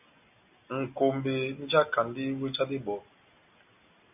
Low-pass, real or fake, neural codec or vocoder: 3.6 kHz; real; none